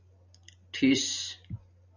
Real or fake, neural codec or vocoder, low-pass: real; none; 7.2 kHz